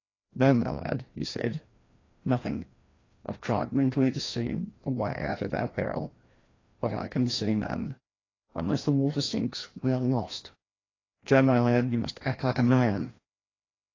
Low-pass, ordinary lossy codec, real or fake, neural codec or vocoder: 7.2 kHz; AAC, 32 kbps; fake; codec, 16 kHz, 1 kbps, FreqCodec, larger model